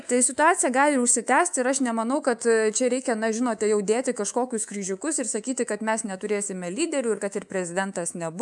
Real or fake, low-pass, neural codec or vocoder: fake; 10.8 kHz; codec, 24 kHz, 3.1 kbps, DualCodec